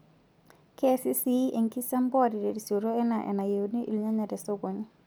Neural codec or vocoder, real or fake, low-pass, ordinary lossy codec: none; real; none; none